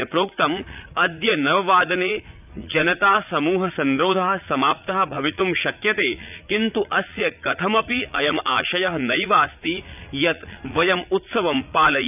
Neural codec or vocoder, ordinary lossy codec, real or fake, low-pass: vocoder, 44.1 kHz, 80 mel bands, Vocos; none; fake; 3.6 kHz